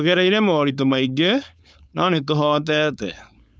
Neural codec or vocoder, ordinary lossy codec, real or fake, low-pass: codec, 16 kHz, 4.8 kbps, FACodec; none; fake; none